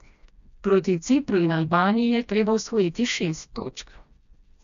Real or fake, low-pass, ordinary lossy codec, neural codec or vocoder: fake; 7.2 kHz; none; codec, 16 kHz, 1 kbps, FreqCodec, smaller model